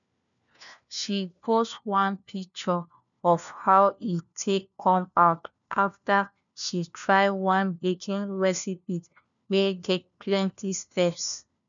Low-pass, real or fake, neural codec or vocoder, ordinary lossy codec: 7.2 kHz; fake; codec, 16 kHz, 1 kbps, FunCodec, trained on LibriTTS, 50 frames a second; none